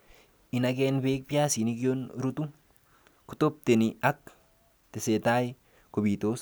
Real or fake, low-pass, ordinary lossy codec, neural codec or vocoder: real; none; none; none